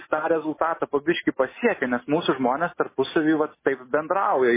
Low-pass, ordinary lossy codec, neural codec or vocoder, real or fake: 3.6 kHz; MP3, 16 kbps; none; real